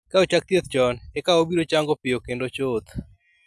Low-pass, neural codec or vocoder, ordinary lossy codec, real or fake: none; none; none; real